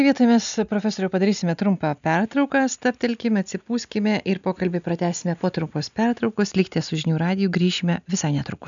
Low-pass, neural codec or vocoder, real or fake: 7.2 kHz; none; real